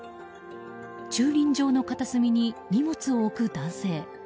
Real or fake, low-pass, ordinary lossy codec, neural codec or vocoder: real; none; none; none